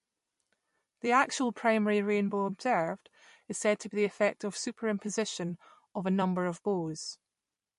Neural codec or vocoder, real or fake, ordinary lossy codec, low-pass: vocoder, 44.1 kHz, 128 mel bands every 256 samples, BigVGAN v2; fake; MP3, 48 kbps; 14.4 kHz